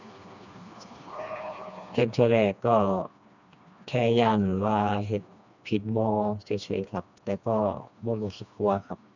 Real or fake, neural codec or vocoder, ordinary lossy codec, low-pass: fake; codec, 16 kHz, 2 kbps, FreqCodec, smaller model; none; 7.2 kHz